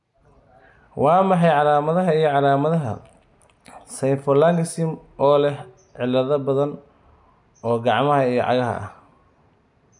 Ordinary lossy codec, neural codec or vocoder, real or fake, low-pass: none; none; real; 10.8 kHz